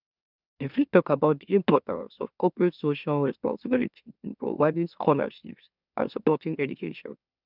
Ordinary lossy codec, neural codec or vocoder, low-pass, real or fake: none; autoencoder, 44.1 kHz, a latent of 192 numbers a frame, MeloTTS; 5.4 kHz; fake